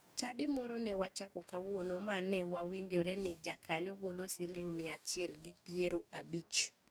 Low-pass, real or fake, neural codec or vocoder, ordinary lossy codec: none; fake; codec, 44.1 kHz, 2.6 kbps, DAC; none